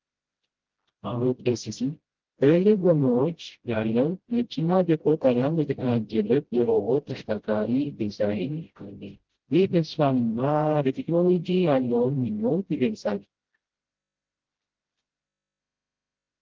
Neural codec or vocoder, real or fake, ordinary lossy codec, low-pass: codec, 16 kHz, 0.5 kbps, FreqCodec, smaller model; fake; Opus, 16 kbps; 7.2 kHz